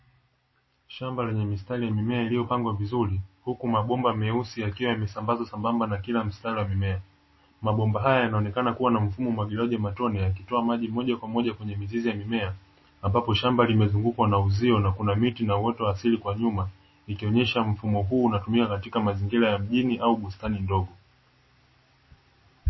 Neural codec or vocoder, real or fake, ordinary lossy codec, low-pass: none; real; MP3, 24 kbps; 7.2 kHz